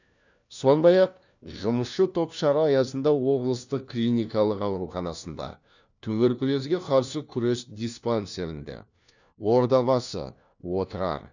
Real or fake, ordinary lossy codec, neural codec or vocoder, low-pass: fake; none; codec, 16 kHz, 1 kbps, FunCodec, trained on LibriTTS, 50 frames a second; 7.2 kHz